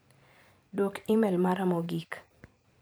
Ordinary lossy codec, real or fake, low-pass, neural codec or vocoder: none; fake; none; vocoder, 44.1 kHz, 128 mel bands every 256 samples, BigVGAN v2